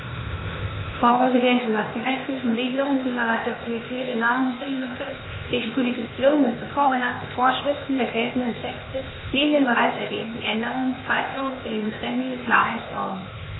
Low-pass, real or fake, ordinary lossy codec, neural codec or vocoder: 7.2 kHz; fake; AAC, 16 kbps; codec, 16 kHz, 0.8 kbps, ZipCodec